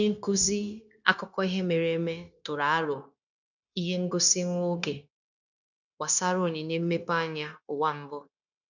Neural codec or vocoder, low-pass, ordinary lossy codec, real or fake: codec, 16 kHz, 0.9 kbps, LongCat-Audio-Codec; 7.2 kHz; none; fake